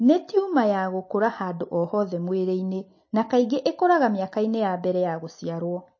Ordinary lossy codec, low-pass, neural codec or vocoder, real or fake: MP3, 32 kbps; 7.2 kHz; none; real